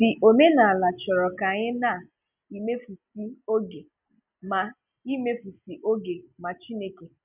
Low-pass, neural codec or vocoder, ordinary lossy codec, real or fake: 3.6 kHz; none; none; real